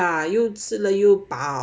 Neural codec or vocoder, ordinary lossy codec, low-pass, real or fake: none; none; none; real